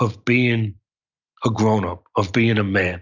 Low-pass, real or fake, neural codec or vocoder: 7.2 kHz; real; none